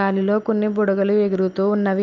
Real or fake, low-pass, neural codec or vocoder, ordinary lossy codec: real; 7.2 kHz; none; Opus, 24 kbps